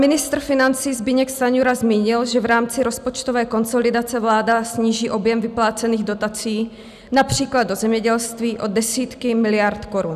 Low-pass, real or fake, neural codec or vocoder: 14.4 kHz; real; none